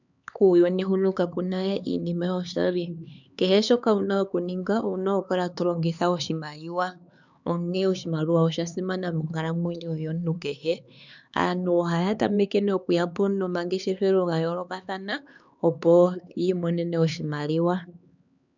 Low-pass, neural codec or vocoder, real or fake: 7.2 kHz; codec, 16 kHz, 4 kbps, X-Codec, HuBERT features, trained on LibriSpeech; fake